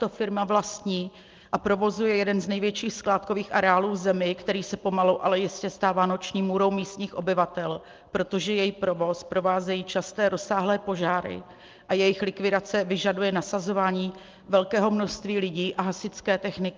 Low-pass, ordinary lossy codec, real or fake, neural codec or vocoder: 7.2 kHz; Opus, 24 kbps; real; none